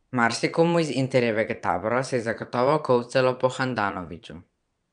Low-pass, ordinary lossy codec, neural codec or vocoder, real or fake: 9.9 kHz; none; vocoder, 22.05 kHz, 80 mel bands, Vocos; fake